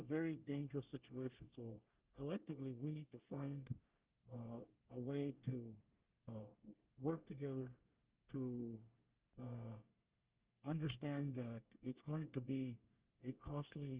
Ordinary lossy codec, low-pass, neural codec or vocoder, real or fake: Opus, 32 kbps; 3.6 kHz; codec, 24 kHz, 1 kbps, SNAC; fake